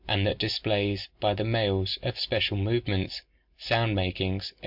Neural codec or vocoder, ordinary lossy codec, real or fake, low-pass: none; MP3, 48 kbps; real; 5.4 kHz